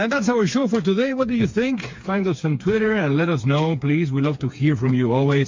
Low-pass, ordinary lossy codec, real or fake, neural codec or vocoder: 7.2 kHz; MP3, 48 kbps; fake; codec, 16 kHz, 4 kbps, FreqCodec, smaller model